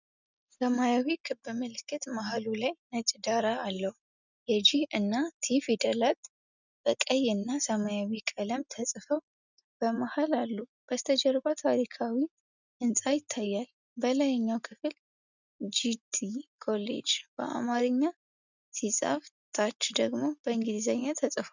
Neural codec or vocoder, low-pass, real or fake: none; 7.2 kHz; real